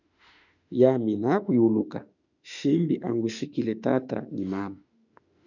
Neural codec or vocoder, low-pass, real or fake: autoencoder, 48 kHz, 32 numbers a frame, DAC-VAE, trained on Japanese speech; 7.2 kHz; fake